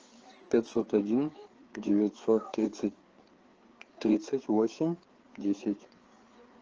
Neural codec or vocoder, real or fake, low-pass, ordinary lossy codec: codec, 16 kHz, 4 kbps, FreqCodec, larger model; fake; 7.2 kHz; Opus, 16 kbps